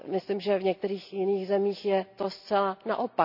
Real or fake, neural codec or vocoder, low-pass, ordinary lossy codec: real; none; 5.4 kHz; none